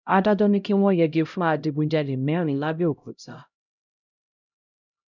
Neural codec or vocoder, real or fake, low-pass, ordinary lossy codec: codec, 16 kHz, 0.5 kbps, X-Codec, HuBERT features, trained on LibriSpeech; fake; 7.2 kHz; none